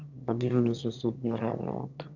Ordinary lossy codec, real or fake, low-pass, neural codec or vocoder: none; fake; 7.2 kHz; autoencoder, 22.05 kHz, a latent of 192 numbers a frame, VITS, trained on one speaker